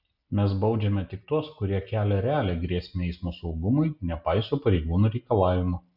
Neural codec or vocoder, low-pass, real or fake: none; 5.4 kHz; real